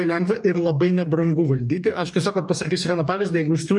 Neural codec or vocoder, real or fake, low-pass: codec, 44.1 kHz, 2.6 kbps, DAC; fake; 10.8 kHz